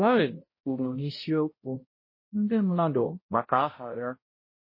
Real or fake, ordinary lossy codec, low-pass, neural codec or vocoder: fake; MP3, 24 kbps; 5.4 kHz; codec, 16 kHz, 0.5 kbps, X-Codec, HuBERT features, trained on balanced general audio